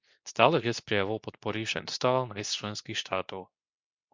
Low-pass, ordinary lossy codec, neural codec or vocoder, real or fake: 7.2 kHz; MP3, 64 kbps; codec, 24 kHz, 0.9 kbps, WavTokenizer, medium speech release version 2; fake